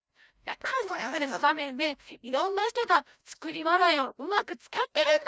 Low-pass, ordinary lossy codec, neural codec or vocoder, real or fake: none; none; codec, 16 kHz, 0.5 kbps, FreqCodec, larger model; fake